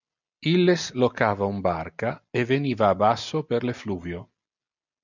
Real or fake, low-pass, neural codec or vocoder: real; 7.2 kHz; none